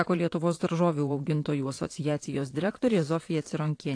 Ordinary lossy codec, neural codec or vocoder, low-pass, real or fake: AAC, 48 kbps; vocoder, 22.05 kHz, 80 mel bands, Vocos; 9.9 kHz; fake